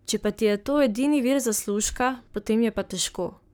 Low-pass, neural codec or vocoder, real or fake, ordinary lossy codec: none; codec, 44.1 kHz, 7.8 kbps, Pupu-Codec; fake; none